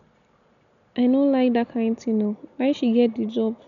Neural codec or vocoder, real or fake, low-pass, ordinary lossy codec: none; real; 7.2 kHz; none